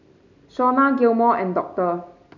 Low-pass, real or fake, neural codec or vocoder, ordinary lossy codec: 7.2 kHz; real; none; none